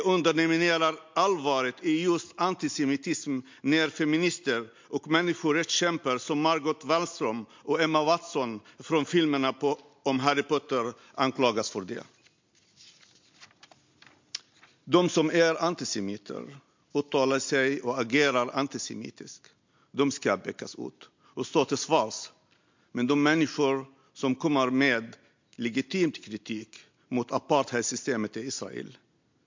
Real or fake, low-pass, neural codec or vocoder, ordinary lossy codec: real; 7.2 kHz; none; MP3, 48 kbps